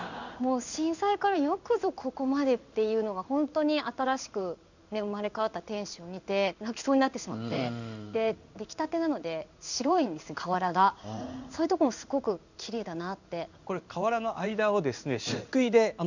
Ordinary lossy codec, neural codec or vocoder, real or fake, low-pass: none; codec, 16 kHz in and 24 kHz out, 1 kbps, XY-Tokenizer; fake; 7.2 kHz